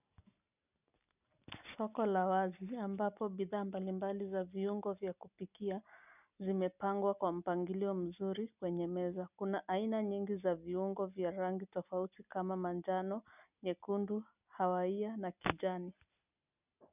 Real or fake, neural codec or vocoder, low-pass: real; none; 3.6 kHz